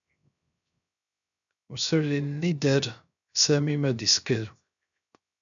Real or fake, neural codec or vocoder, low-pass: fake; codec, 16 kHz, 0.3 kbps, FocalCodec; 7.2 kHz